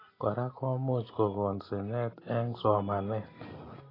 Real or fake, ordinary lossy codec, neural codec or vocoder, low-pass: real; AAC, 24 kbps; none; 5.4 kHz